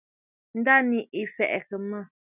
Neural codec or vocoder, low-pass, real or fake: none; 3.6 kHz; real